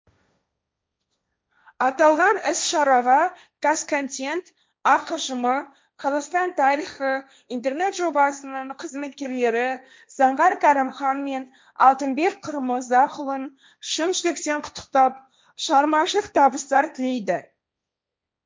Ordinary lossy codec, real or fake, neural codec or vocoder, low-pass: none; fake; codec, 16 kHz, 1.1 kbps, Voila-Tokenizer; none